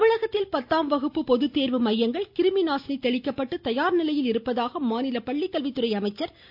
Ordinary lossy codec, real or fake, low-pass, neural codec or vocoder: none; real; 5.4 kHz; none